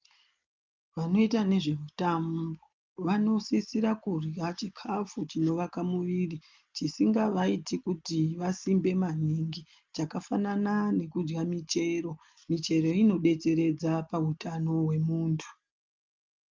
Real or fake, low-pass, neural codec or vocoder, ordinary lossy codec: real; 7.2 kHz; none; Opus, 24 kbps